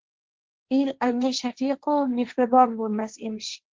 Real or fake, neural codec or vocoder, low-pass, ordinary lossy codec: fake; codec, 16 kHz, 1.1 kbps, Voila-Tokenizer; 7.2 kHz; Opus, 16 kbps